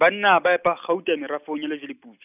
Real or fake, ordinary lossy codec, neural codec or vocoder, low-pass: real; none; none; 3.6 kHz